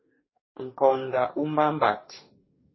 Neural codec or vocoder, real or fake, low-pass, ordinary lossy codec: codec, 44.1 kHz, 2.6 kbps, DAC; fake; 7.2 kHz; MP3, 24 kbps